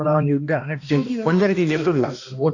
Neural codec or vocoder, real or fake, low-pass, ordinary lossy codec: codec, 16 kHz, 1 kbps, X-Codec, HuBERT features, trained on balanced general audio; fake; 7.2 kHz; none